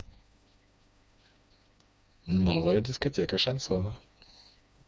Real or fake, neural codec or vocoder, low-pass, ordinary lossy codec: fake; codec, 16 kHz, 2 kbps, FreqCodec, smaller model; none; none